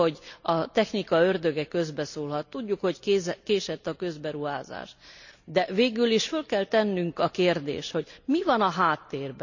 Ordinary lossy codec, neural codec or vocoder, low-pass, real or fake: none; none; 7.2 kHz; real